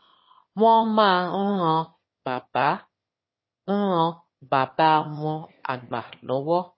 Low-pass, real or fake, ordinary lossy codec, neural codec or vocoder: 7.2 kHz; fake; MP3, 24 kbps; autoencoder, 22.05 kHz, a latent of 192 numbers a frame, VITS, trained on one speaker